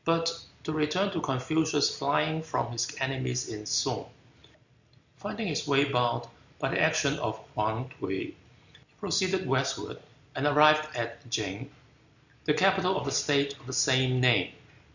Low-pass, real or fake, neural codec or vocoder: 7.2 kHz; real; none